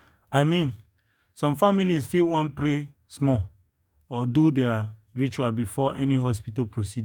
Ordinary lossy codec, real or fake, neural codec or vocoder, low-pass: none; fake; codec, 44.1 kHz, 2.6 kbps, DAC; 19.8 kHz